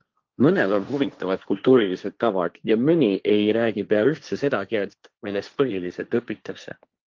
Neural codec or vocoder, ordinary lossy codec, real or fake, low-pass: codec, 16 kHz, 1.1 kbps, Voila-Tokenizer; Opus, 24 kbps; fake; 7.2 kHz